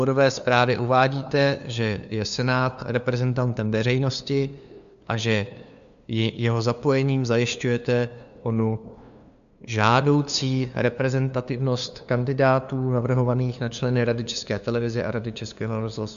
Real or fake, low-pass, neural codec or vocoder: fake; 7.2 kHz; codec, 16 kHz, 2 kbps, FunCodec, trained on LibriTTS, 25 frames a second